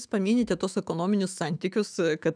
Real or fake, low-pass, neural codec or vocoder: fake; 9.9 kHz; autoencoder, 48 kHz, 128 numbers a frame, DAC-VAE, trained on Japanese speech